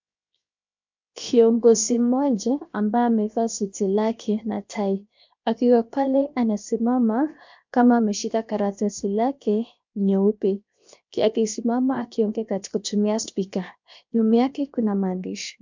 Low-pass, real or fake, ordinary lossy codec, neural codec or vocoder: 7.2 kHz; fake; MP3, 64 kbps; codec, 16 kHz, 0.7 kbps, FocalCodec